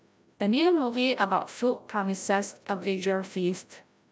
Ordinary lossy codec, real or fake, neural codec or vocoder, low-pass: none; fake; codec, 16 kHz, 0.5 kbps, FreqCodec, larger model; none